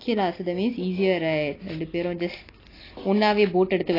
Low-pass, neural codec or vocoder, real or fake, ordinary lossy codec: 5.4 kHz; none; real; AAC, 24 kbps